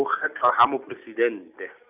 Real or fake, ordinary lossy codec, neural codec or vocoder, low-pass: real; none; none; 3.6 kHz